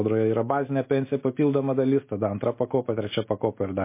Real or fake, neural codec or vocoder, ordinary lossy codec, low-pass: real; none; MP3, 24 kbps; 3.6 kHz